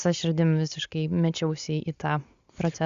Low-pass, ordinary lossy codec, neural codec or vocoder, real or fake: 7.2 kHz; Opus, 64 kbps; none; real